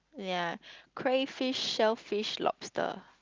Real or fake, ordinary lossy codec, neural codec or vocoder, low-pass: real; Opus, 24 kbps; none; 7.2 kHz